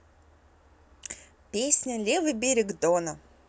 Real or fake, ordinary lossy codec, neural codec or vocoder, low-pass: real; none; none; none